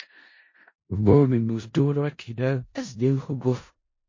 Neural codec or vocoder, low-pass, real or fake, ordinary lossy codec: codec, 16 kHz in and 24 kHz out, 0.4 kbps, LongCat-Audio-Codec, four codebook decoder; 7.2 kHz; fake; MP3, 32 kbps